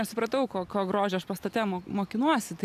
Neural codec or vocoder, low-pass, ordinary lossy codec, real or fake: none; 14.4 kHz; AAC, 96 kbps; real